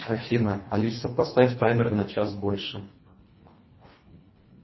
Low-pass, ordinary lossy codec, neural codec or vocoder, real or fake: 7.2 kHz; MP3, 24 kbps; codec, 24 kHz, 1.5 kbps, HILCodec; fake